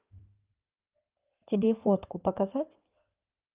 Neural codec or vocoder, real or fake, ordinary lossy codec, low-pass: codec, 16 kHz in and 24 kHz out, 2.2 kbps, FireRedTTS-2 codec; fake; Opus, 32 kbps; 3.6 kHz